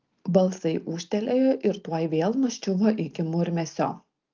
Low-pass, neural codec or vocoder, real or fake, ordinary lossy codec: 7.2 kHz; none; real; Opus, 24 kbps